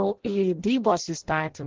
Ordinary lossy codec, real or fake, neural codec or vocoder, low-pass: Opus, 16 kbps; fake; codec, 16 kHz in and 24 kHz out, 0.6 kbps, FireRedTTS-2 codec; 7.2 kHz